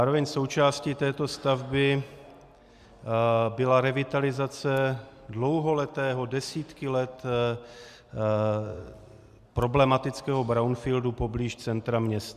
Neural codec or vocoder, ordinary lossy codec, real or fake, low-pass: none; Opus, 64 kbps; real; 14.4 kHz